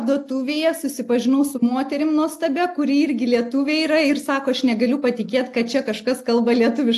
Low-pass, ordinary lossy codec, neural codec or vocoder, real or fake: 14.4 kHz; AAC, 64 kbps; none; real